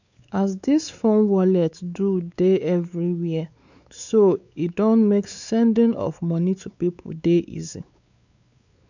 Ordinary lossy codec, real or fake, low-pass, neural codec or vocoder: none; fake; 7.2 kHz; codec, 16 kHz, 4 kbps, X-Codec, WavLM features, trained on Multilingual LibriSpeech